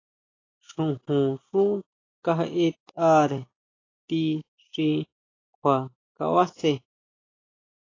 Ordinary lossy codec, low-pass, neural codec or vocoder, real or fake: AAC, 32 kbps; 7.2 kHz; none; real